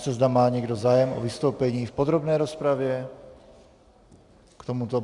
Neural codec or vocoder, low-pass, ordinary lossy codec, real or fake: none; 10.8 kHz; Opus, 64 kbps; real